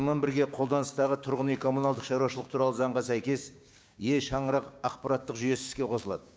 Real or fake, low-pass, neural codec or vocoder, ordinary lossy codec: fake; none; codec, 16 kHz, 6 kbps, DAC; none